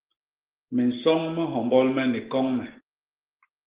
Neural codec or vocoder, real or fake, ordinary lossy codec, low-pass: none; real; Opus, 16 kbps; 3.6 kHz